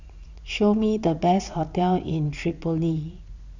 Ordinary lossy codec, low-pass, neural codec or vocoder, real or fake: none; 7.2 kHz; vocoder, 44.1 kHz, 80 mel bands, Vocos; fake